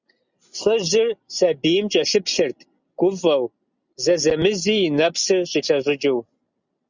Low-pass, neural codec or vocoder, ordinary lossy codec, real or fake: 7.2 kHz; none; Opus, 64 kbps; real